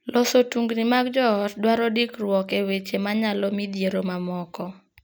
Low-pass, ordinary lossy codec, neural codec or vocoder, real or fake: none; none; none; real